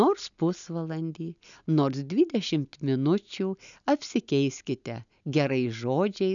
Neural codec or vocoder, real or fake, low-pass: none; real; 7.2 kHz